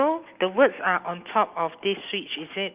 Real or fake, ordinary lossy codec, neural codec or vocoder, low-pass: real; Opus, 24 kbps; none; 3.6 kHz